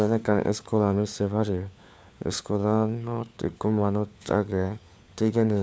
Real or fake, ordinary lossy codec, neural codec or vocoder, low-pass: fake; none; codec, 16 kHz, 4 kbps, FunCodec, trained on LibriTTS, 50 frames a second; none